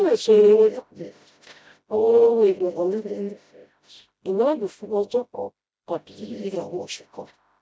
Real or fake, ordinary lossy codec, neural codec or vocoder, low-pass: fake; none; codec, 16 kHz, 0.5 kbps, FreqCodec, smaller model; none